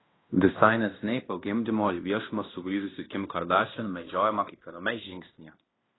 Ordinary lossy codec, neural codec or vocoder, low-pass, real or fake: AAC, 16 kbps; codec, 16 kHz in and 24 kHz out, 0.9 kbps, LongCat-Audio-Codec, fine tuned four codebook decoder; 7.2 kHz; fake